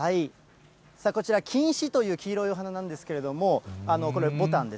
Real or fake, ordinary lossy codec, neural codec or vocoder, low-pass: real; none; none; none